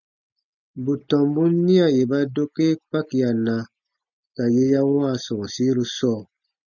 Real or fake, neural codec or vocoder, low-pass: real; none; 7.2 kHz